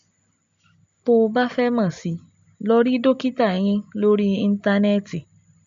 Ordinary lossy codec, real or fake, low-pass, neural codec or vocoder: MP3, 64 kbps; real; 7.2 kHz; none